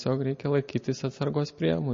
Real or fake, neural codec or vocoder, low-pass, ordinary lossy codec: real; none; 7.2 kHz; MP3, 32 kbps